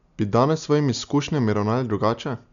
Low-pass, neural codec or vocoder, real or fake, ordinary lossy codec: 7.2 kHz; none; real; none